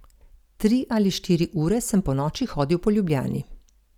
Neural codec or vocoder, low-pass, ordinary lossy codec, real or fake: none; 19.8 kHz; none; real